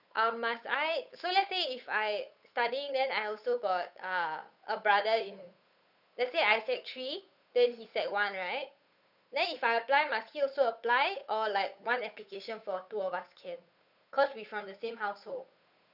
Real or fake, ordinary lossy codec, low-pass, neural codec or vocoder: fake; none; 5.4 kHz; codec, 16 kHz, 8 kbps, FunCodec, trained on Chinese and English, 25 frames a second